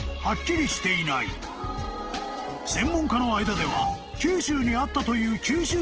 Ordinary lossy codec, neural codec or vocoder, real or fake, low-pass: Opus, 16 kbps; none; real; 7.2 kHz